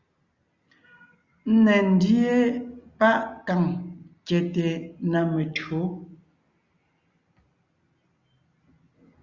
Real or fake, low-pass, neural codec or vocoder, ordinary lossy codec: real; 7.2 kHz; none; Opus, 64 kbps